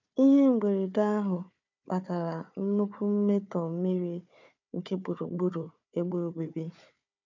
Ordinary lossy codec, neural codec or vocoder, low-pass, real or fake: none; codec, 16 kHz, 4 kbps, FunCodec, trained on Chinese and English, 50 frames a second; 7.2 kHz; fake